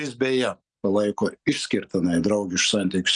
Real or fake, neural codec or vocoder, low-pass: real; none; 9.9 kHz